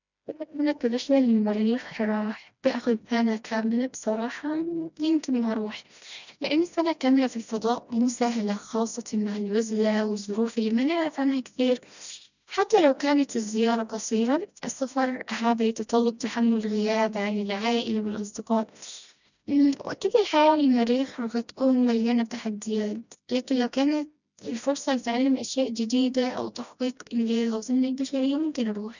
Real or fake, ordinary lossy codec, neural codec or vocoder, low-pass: fake; none; codec, 16 kHz, 1 kbps, FreqCodec, smaller model; 7.2 kHz